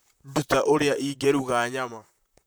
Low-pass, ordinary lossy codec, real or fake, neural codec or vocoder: none; none; fake; vocoder, 44.1 kHz, 128 mel bands, Pupu-Vocoder